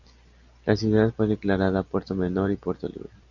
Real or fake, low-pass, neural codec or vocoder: real; 7.2 kHz; none